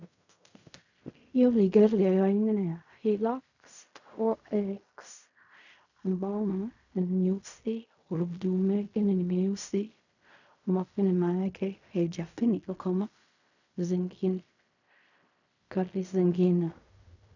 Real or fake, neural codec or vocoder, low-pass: fake; codec, 16 kHz in and 24 kHz out, 0.4 kbps, LongCat-Audio-Codec, fine tuned four codebook decoder; 7.2 kHz